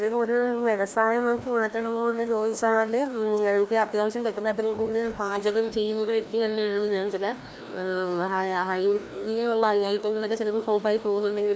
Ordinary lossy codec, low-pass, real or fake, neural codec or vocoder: none; none; fake; codec, 16 kHz, 1 kbps, FreqCodec, larger model